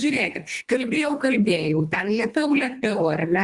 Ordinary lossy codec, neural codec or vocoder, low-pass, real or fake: Opus, 64 kbps; codec, 24 kHz, 1.5 kbps, HILCodec; 10.8 kHz; fake